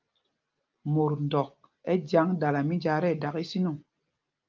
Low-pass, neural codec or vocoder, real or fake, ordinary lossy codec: 7.2 kHz; none; real; Opus, 24 kbps